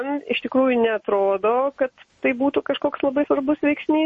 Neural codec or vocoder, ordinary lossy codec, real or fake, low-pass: none; MP3, 32 kbps; real; 10.8 kHz